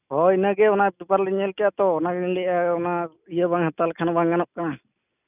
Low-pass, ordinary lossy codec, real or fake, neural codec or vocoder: 3.6 kHz; none; real; none